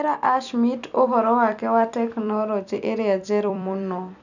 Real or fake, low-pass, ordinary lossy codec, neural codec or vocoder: fake; 7.2 kHz; none; vocoder, 44.1 kHz, 128 mel bands every 256 samples, BigVGAN v2